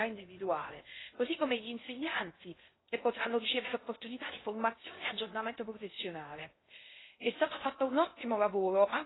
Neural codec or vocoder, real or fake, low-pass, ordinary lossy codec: codec, 16 kHz in and 24 kHz out, 0.6 kbps, FocalCodec, streaming, 4096 codes; fake; 7.2 kHz; AAC, 16 kbps